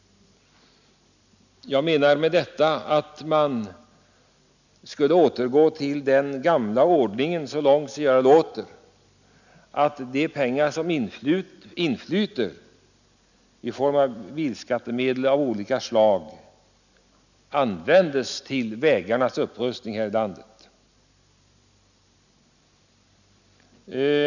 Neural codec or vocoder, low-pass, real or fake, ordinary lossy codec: none; 7.2 kHz; real; none